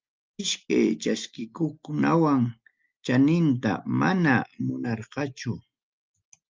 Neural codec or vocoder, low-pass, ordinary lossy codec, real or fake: none; 7.2 kHz; Opus, 32 kbps; real